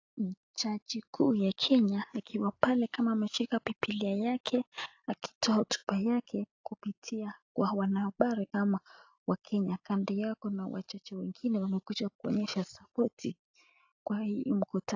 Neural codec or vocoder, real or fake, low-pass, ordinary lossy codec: none; real; 7.2 kHz; AAC, 48 kbps